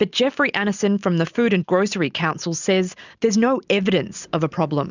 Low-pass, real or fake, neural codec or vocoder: 7.2 kHz; real; none